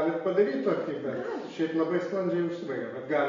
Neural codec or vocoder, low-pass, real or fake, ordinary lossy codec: none; 7.2 kHz; real; AAC, 32 kbps